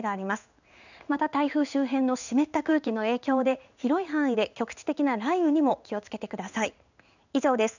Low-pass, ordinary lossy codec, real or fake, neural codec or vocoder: 7.2 kHz; none; fake; codec, 16 kHz in and 24 kHz out, 1 kbps, XY-Tokenizer